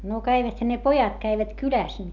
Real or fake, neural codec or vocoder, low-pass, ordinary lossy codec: real; none; 7.2 kHz; none